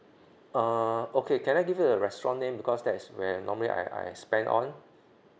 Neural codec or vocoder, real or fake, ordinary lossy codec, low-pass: none; real; none; none